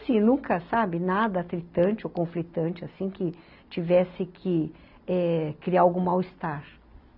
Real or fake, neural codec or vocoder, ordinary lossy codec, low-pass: real; none; none; 5.4 kHz